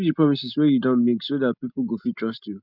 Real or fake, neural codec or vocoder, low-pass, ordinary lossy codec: real; none; 5.4 kHz; none